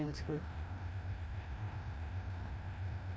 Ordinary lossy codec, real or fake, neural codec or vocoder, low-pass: none; fake; codec, 16 kHz, 1 kbps, FreqCodec, larger model; none